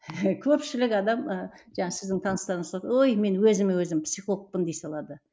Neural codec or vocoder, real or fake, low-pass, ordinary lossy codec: none; real; none; none